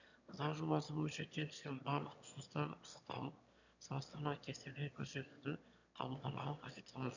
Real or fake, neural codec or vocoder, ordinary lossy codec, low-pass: fake; autoencoder, 22.05 kHz, a latent of 192 numbers a frame, VITS, trained on one speaker; none; 7.2 kHz